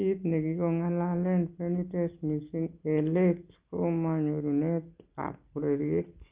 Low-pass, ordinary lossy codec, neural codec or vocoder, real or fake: 3.6 kHz; Opus, 32 kbps; none; real